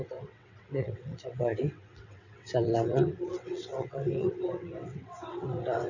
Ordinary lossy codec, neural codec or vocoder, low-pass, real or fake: none; vocoder, 22.05 kHz, 80 mel bands, WaveNeXt; 7.2 kHz; fake